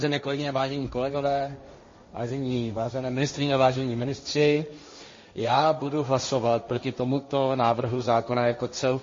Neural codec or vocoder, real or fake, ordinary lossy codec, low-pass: codec, 16 kHz, 1.1 kbps, Voila-Tokenizer; fake; MP3, 32 kbps; 7.2 kHz